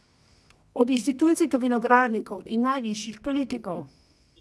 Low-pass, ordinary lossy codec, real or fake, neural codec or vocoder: none; none; fake; codec, 24 kHz, 0.9 kbps, WavTokenizer, medium music audio release